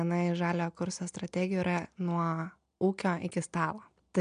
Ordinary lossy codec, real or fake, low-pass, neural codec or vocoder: MP3, 64 kbps; real; 9.9 kHz; none